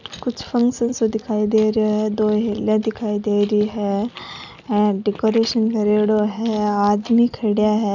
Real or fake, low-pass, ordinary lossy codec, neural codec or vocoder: real; 7.2 kHz; none; none